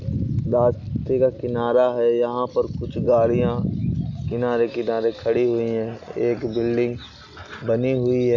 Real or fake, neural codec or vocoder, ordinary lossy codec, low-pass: real; none; none; 7.2 kHz